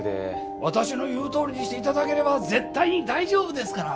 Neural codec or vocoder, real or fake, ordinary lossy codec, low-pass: none; real; none; none